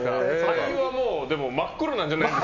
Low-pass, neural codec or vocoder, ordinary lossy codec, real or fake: 7.2 kHz; none; none; real